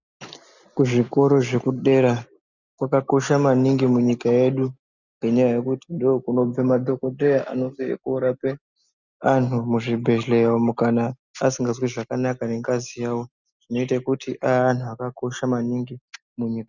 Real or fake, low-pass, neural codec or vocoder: real; 7.2 kHz; none